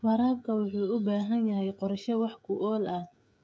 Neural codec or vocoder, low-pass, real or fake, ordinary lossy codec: codec, 16 kHz, 16 kbps, FreqCodec, smaller model; none; fake; none